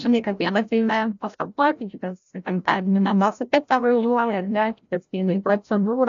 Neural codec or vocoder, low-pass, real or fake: codec, 16 kHz, 0.5 kbps, FreqCodec, larger model; 7.2 kHz; fake